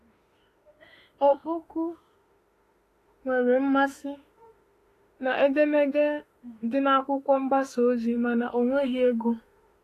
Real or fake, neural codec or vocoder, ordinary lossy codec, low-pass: fake; autoencoder, 48 kHz, 32 numbers a frame, DAC-VAE, trained on Japanese speech; AAC, 48 kbps; 14.4 kHz